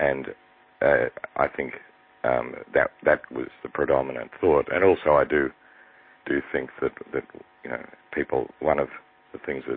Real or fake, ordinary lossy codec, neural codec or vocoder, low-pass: real; MP3, 24 kbps; none; 5.4 kHz